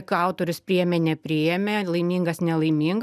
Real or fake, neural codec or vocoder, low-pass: real; none; 14.4 kHz